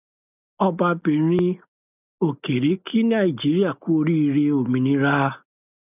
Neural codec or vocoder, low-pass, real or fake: none; 3.6 kHz; real